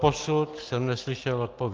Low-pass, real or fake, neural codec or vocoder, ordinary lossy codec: 7.2 kHz; real; none; Opus, 32 kbps